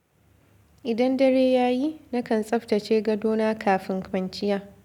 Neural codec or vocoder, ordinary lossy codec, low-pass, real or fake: none; none; 19.8 kHz; real